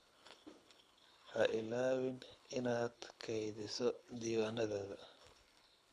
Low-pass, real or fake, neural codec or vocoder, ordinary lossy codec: none; fake; codec, 24 kHz, 6 kbps, HILCodec; none